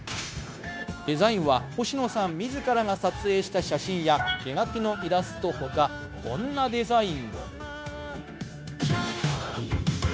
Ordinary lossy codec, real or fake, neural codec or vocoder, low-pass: none; fake; codec, 16 kHz, 0.9 kbps, LongCat-Audio-Codec; none